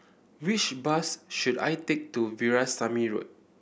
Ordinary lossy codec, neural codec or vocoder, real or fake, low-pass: none; none; real; none